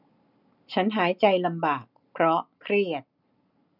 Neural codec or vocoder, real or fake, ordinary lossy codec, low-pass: none; real; none; 5.4 kHz